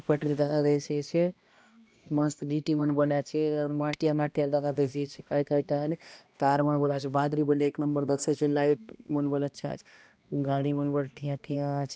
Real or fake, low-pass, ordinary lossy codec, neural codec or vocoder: fake; none; none; codec, 16 kHz, 1 kbps, X-Codec, HuBERT features, trained on balanced general audio